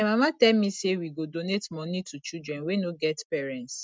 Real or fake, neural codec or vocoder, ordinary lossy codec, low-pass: real; none; none; none